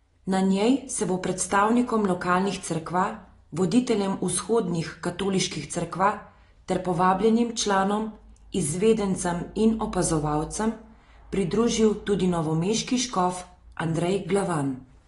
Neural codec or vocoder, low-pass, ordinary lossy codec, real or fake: none; 10.8 kHz; AAC, 32 kbps; real